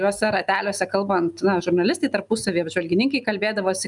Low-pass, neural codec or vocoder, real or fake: 10.8 kHz; none; real